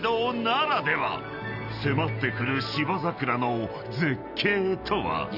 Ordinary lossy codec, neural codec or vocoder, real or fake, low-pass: none; none; real; 5.4 kHz